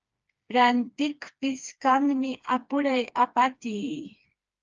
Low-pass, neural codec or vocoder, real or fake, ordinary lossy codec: 7.2 kHz; codec, 16 kHz, 2 kbps, FreqCodec, smaller model; fake; Opus, 24 kbps